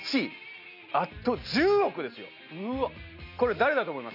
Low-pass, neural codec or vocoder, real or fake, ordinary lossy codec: 5.4 kHz; none; real; none